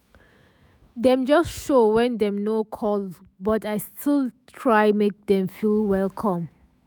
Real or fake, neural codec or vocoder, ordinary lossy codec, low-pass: fake; autoencoder, 48 kHz, 128 numbers a frame, DAC-VAE, trained on Japanese speech; none; none